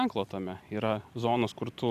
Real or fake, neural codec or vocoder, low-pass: real; none; 14.4 kHz